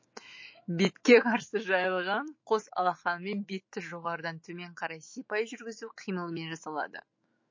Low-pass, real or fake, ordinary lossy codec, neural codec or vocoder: 7.2 kHz; fake; MP3, 32 kbps; autoencoder, 48 kHz, 128 numbers a frame, DAC-VAE, trained on Japanese speech